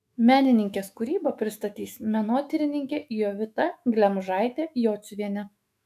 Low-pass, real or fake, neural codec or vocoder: 14.4 kHz; fake; autoencoder, 48 kHz, 128 numbers a frame, DAC-VAE, trained on Japanese speech